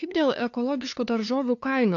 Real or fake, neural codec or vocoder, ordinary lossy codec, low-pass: fake; codec, 16 kHz, 2 kbps, FunCodec, trained on LibriTTS, 25 frames a second; AAC, 48 kbps; 7.2 kHz